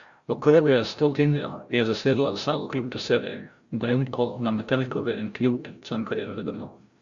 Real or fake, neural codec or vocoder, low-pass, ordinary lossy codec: fake; codec, 16 kHz, 0.5 kbps, FreqCodec, larger model; 7.2 kHz; Opus, 64 kbps